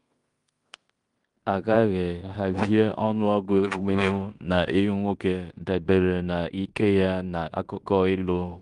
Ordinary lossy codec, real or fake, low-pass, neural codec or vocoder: Opus, 32 kbps; fake; 10.8 kHz; codec, 16 kHz in and 24 kHz out, 0.9 kbps, LongCat-Audio-Codec, fine tuned four codebook decoder